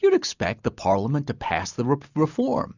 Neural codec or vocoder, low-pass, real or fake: none; 7.2 kHz; real